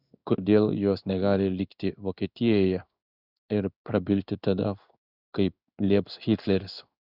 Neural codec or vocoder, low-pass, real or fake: codec, 16 kHz in and 24 kHz out, 1 kbps, XY-Tokenizer; 5.4 kHz; fake